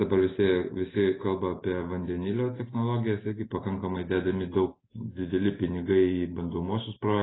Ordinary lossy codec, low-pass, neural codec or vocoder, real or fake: AAC, 16 kbps; 7.2 kHz; none; real